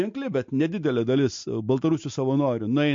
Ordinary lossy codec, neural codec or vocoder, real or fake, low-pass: MP3, 48 kbps; none; real; 7.2 kHz